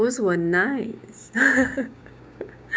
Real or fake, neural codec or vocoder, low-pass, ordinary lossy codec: fake; codec, 16 kHz, 6 kbps, DAC; none; none